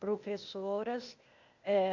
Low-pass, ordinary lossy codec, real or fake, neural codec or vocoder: 7.2 kHz; MP3, 48 kbps; fake; codec, 16 kHz, 0.8 kbps, ZipCodec